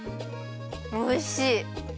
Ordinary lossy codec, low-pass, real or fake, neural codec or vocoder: none; none; real; none